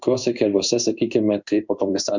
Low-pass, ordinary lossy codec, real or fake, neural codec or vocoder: 7.2 kHz; Opus, 64 kbps; fake; codec, 16 kHz in and 24 kHz out, 1 kbps, XY-Tokenizer